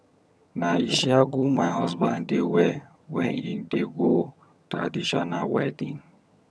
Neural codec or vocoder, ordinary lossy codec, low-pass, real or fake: vocoder, 22.05 kHz, 80 mel bands, HiFi-GAN; none; none; fake